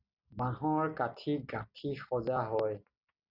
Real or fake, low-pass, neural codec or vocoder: real; 5.4 kHz; none